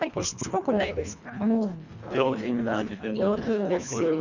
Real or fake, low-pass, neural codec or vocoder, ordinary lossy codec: fake; 7.2 kHz; codec, 24 kHz, 1.5 kbps, HILCodec; none